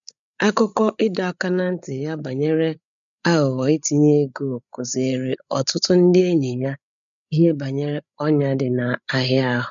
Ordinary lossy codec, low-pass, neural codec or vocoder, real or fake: none; 7.2 kHz; codec, 16 kHz, 8 kbps, FreqCodec, larger model; fake